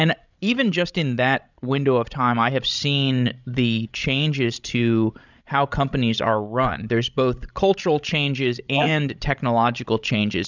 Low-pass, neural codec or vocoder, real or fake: 7.2 kHz; codec, 16 kHz, 16 kbps, FreqCodec, larger model; fake